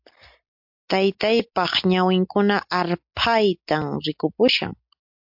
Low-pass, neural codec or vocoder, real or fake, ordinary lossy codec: 5.4 kHz; none; real; MP3, 48 kbps